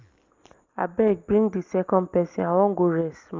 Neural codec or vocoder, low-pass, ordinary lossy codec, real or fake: none; 7.2 kHz; Opus, 32 kbps; real